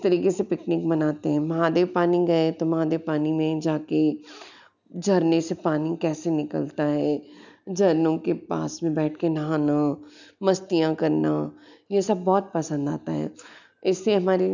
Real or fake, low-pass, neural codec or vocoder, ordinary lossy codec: real; 7.2 kHz; none; none